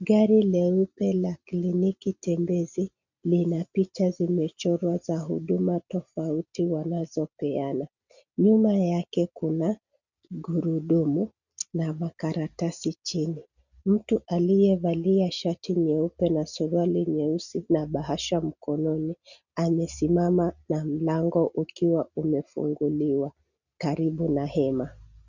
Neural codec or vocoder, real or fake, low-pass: none; real; 7.2 kHz